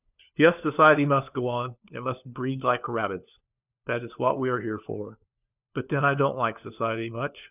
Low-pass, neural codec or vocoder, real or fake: 3.6 kHz; codec, 16 kHz, 4 kbps, FunCodec, trained on LibriTTS, 50 frames a second; fake